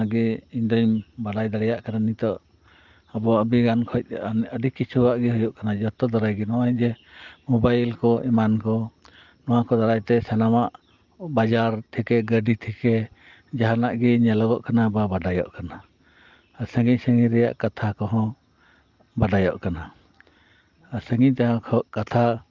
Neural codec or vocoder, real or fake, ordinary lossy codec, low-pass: none; real; Opus, 32 kbps; 7.2 kHz